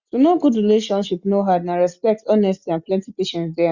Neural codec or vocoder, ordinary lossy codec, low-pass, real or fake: none; Opus, 64 kbps; 7.2 kHz; real